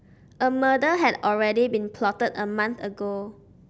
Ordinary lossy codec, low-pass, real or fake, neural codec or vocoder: none; none; real; none